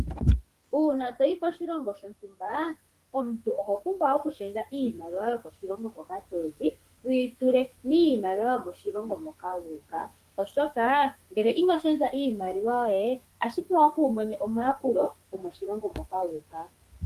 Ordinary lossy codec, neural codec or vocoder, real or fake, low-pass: Opus, 32 kbps; codec, 32 kHz, 1.9 kbps, SNAC; fake; 14.4 kHz